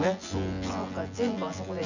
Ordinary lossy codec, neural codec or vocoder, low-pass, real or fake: none; vocoder, 24 kHz, 100 mel bands, Vocos; 7.2 kHz; fake